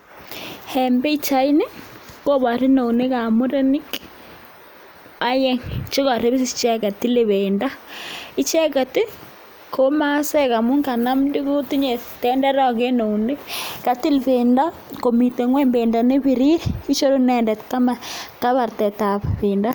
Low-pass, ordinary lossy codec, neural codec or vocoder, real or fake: none; none; none; real